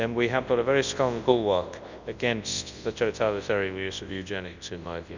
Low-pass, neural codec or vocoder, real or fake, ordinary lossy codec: 7.2 kHz; codec, 24 kHz, 0.9 kbps, WavTokenizer, large speech release; fake; Opus, 64 kbps